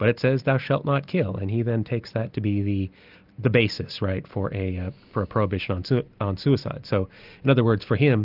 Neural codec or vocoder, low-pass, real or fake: none; 5.4 kHz; real